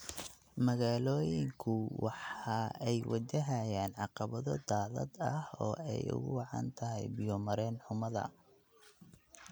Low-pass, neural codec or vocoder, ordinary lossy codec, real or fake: none; none; none; real